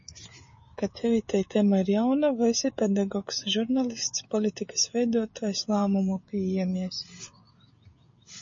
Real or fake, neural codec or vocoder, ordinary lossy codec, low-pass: fake; codec, 16 kHz, 16 kbps, FreqCodec, smaller model; MP3, 32 kbps; 7.2 kHz